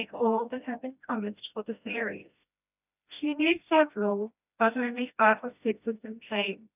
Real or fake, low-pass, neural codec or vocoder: fake; 3.6 kHz; codec, 16 kHz, 1 kbps, FreqCodec, smaller model